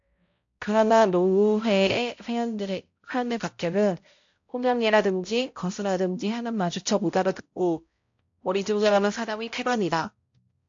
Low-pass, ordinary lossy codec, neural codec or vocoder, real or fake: 7.2 kHz; AAC, 48 kbps; codec, 16 kHz, 0.5 kbps, X-Codec, HuBERT features, trained on balanced general audio; fake